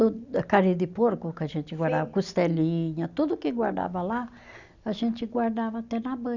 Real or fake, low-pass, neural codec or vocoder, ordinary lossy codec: real; 7.2 kHz; none; none